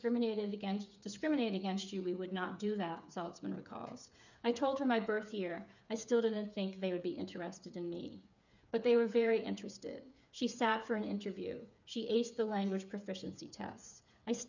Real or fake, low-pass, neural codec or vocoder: fake; 7.2 kHz; codec, 16 kHz, 8 kbps, FreqCodec, smaller model